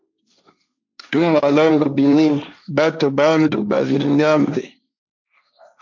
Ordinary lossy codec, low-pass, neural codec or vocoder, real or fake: MP3, 64 kbps; 7.2 kHz; codec, 16 kHz, 1.1 kbps, Voila-Tokenizer; fake